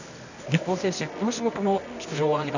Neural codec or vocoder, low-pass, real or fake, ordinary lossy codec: codec, 24 kHz, 0.9 kbps, WavTokenizer, medium music audio release; 7.2 kHz; fake; none